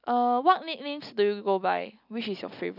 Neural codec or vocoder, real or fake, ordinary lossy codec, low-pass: none; real; none; 5.4 kHz